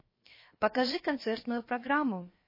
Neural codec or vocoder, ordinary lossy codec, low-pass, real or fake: codec, 16 kHz, 0.7 kbps, FocalCodec; MP3, 24 kbps; 5.4 kHz; fake